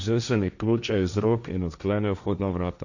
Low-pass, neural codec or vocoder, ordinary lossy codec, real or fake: 7.2 kHz; codec, 16 kHz, 1.1 kbps, Voila-Tokenizer; none; fake